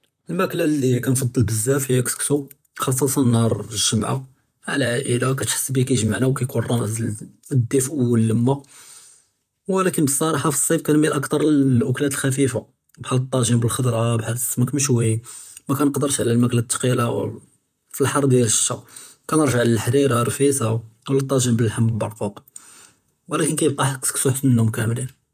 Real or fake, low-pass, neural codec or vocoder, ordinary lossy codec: fake; 14.4 kHz; vocoder, 44.1 kHz, 128 mel bands, Pupu-Vocoder; none